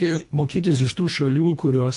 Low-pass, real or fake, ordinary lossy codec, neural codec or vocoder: 10.8 kHz; fake; AAC, 64 kbps; codec, 24 kHz, 1.5 kbps, HILCodec